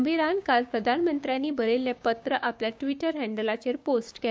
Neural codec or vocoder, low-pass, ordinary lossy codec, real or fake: codec, 16 kHz, 6 kbps, DAC; none; none; fake